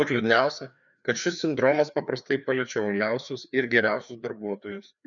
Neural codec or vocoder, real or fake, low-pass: codec, 16 kHz, 2 kbps, FreqCodec, larger model; fake; 7.2 kHz